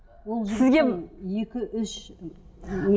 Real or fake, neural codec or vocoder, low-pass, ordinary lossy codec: real; none; none; none